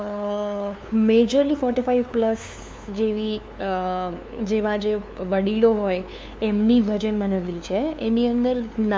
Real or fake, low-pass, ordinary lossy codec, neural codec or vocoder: fake; none; none; codec, 16 kHz, 2 kbps, FunCodec, trained on LibriTTS, 25 frames a second